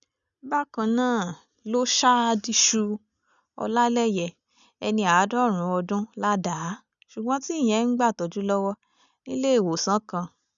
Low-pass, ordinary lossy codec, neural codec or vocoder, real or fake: 7.2 kHz; none; none; real